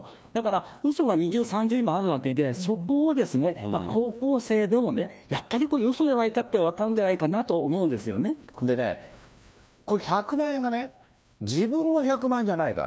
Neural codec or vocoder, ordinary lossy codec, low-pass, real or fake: codec, 16 kHz, 1 kbps, FreqCodec, larger model; none; none; fake